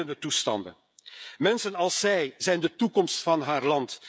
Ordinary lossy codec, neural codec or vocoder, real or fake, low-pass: none; codec, 16 kHz, 16 kbps, FreqCodec, smaller model; fake; none